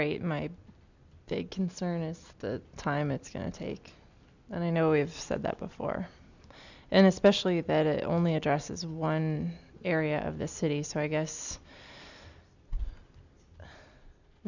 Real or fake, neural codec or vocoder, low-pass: real; none; 7.2 kHz